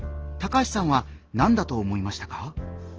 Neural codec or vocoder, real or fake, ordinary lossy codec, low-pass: none; real; Opus, 16 kbps; 7.2 kHz